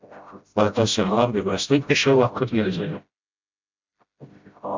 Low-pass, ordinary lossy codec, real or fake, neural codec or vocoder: 7.2 kHz; MP3, 64 kbps; fake; codec, 16 kHz, 0.5 kbps, FreqCodec, smaller model